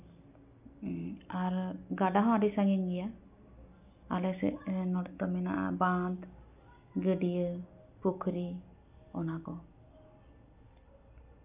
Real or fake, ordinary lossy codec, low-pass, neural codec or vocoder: real; none; 3.6 kHz; none